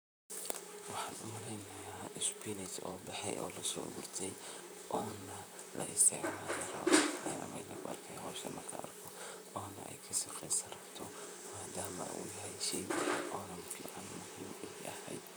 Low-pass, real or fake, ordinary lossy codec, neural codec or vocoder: none; fake; none; vocoder, 44.1 kHz, 128 mel bands, Pupu-Vocoder